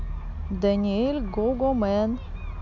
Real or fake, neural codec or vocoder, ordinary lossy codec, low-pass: real; none; none; 7.2 kHz